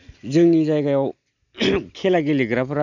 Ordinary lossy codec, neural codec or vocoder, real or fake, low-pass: none; none; real; 7.2 kHz